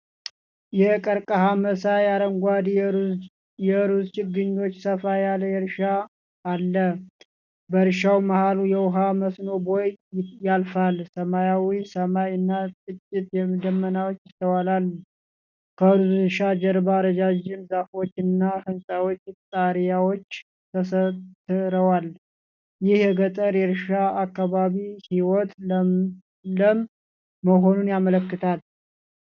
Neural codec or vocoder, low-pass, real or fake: none; 7.2 kHz; real